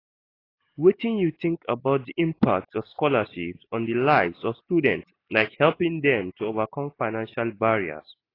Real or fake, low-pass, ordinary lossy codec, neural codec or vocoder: real; 5.4 kHz; AAC, 24 kbps; none